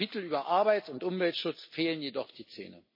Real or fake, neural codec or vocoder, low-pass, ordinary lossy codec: real; none; 5.4 kHz; MP3, 24 kbps